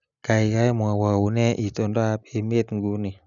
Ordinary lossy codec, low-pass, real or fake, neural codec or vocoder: none; 7.2 kHz; real; none